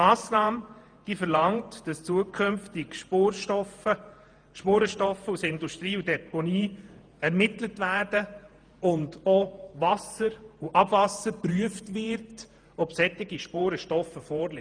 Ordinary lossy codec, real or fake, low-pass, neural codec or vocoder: Opus, 32 kbps; fake; 9.9 kHz; vocoder, 48 kHz, 128 mel bands, Vocos